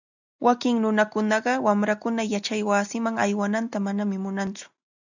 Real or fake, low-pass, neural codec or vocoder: real; 7.2 kHz; none